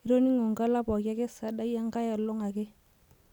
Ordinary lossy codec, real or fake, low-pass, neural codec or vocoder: none; real; 19.8 kHz; none